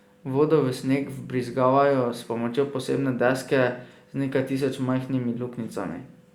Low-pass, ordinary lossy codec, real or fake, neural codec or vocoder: 19.8 kHz; Opus, 64 kbps; real; none